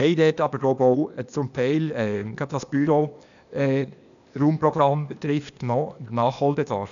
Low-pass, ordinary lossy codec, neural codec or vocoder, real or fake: 7.2 kHz; none; codec, 16 kHz, 0.8 kbps, ZipCodec; fake